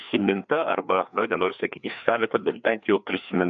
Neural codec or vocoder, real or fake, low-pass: codec, 16 kHz, 2 kbps, FreqCodec, larger model; fake; 7.2 kHz